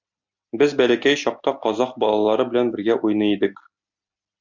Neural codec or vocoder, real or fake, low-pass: none; real; 7.2 kHz